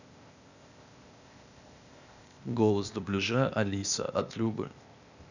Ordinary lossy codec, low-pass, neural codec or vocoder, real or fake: none; 7.2 kHz; codec, 16 kHz, 0.8 kbps, ZipCodec; fake